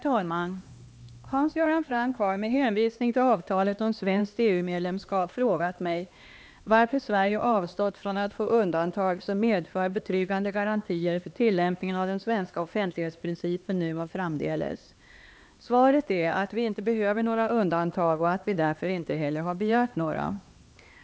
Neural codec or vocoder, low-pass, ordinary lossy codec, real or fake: codec, 16 kHz, 2 kbps, X-Codec, HuBERT features, trained on LibriSpeech; none; none; fake